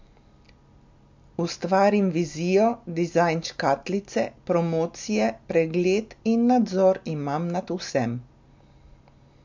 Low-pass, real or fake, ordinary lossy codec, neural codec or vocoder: 7.2 kHz; real; MP3, 64 kbps; none